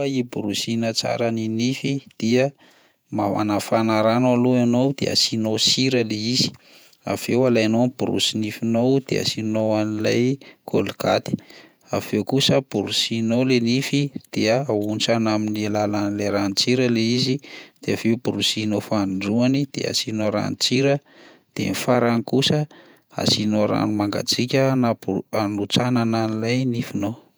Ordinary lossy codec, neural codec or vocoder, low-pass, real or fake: none; vocoder, 48 kHz, 128 mel bands, Vocos; none; fake